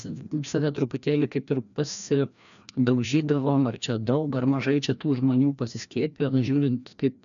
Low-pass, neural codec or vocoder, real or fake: 7.2 kHz; codec, 16 kHz, 1 kbps, FreqCodec, larger model; fake